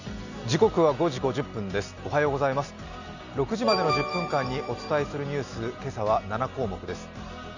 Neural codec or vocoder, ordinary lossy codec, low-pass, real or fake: none; none; 7.2 kHz; real